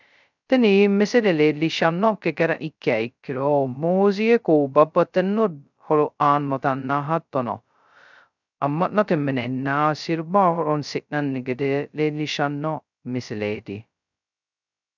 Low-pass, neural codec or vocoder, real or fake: 7.2 kHz; codec, 16 kHz, 0.2 kbps, FocalCodec; fake